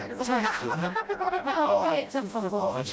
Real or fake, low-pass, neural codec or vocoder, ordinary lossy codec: fake; none; codec, 16 kHz, 0.5 kbps, FreqCodec, smaller model; none